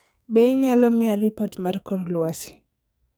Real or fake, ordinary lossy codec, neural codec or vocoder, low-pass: fake; none; codec, 44.1 kHz, 2.6 kbps, SNAC; none